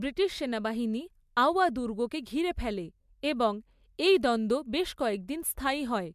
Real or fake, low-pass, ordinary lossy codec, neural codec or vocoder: real; 14.4 kHz; MP3, 96 kbps; none